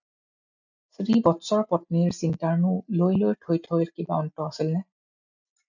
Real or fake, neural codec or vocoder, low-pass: real; none; 7.2 kHz